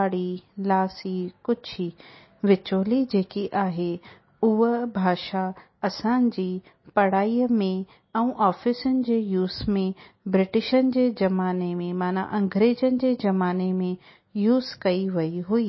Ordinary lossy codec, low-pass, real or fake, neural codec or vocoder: MP3, 24 kbps; 7.2 kHz; real; none